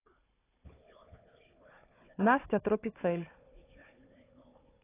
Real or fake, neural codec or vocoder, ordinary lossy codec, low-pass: fake; codec, 16 kHz, 4 kbps, FunCodec, trained on Chinese and English, 50 frames a second; AAC, 24 kbps; 3.6 kHz